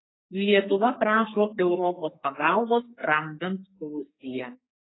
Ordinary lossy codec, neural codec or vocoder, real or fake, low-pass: AAC, 16 kbps; codec, 32 kHz, 1.9 kbps, SNAC; fake; 7.2 kHz